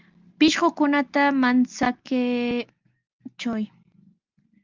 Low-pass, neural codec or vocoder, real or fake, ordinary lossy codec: 7.2 kHz; none; real; Opus, 32 kbps